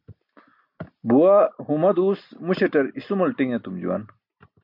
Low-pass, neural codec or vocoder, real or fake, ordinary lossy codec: 5.4 kHz; none; real; MP3, 48 kbps